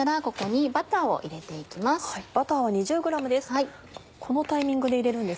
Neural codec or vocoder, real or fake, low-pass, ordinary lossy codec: none; real; none; none